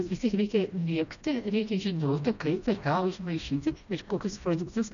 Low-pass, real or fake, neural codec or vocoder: 7.2 kHz; fake; codec, 16 kHz, 1 kbps, FreqCodec, smaller model